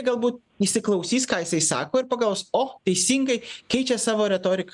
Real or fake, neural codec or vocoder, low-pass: real; none; 10.8 kHz